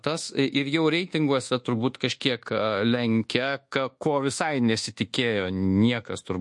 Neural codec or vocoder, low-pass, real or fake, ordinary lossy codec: codec, 24 kHz, 1.2 kbps, DualCodec; 10.8 kHz; fake; MP3, 48 kbps